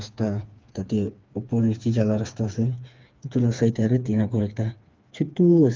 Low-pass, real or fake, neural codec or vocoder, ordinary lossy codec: 7.2 kHz; fake; codec, 16 kHz, 4 kbps, FreqCodec, smaller model; Opus, 24 kbps